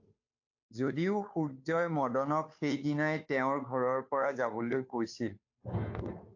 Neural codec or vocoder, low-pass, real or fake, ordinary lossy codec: codec, 16 kHz, 2 kbps, FunCodec, trained on Chinese and English, 25 frames a second; 7.2 kHz; fake; AAC, 48 kbps